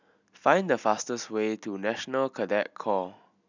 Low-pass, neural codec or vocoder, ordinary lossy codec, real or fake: 7.2 kHz; none; none; real